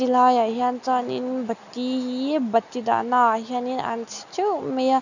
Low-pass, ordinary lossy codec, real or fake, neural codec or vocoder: 7.2 kHz; none; real; none